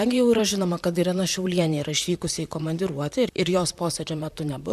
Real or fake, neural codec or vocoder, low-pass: fake; vocoder, 44.1 kHz, 128 mel bands, Pupu-Vocoder; 14.4 kHz